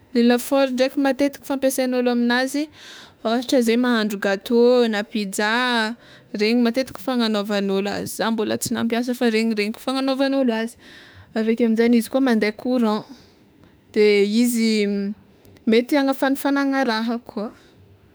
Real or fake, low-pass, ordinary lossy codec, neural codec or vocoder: fake; none; none; autoencoder, 48 kHz, 32 numbers a frame, DAC-VAE, trained on Japanese speech